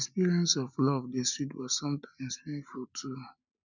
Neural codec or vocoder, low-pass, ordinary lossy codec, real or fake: none; 7.2 kHz; none; real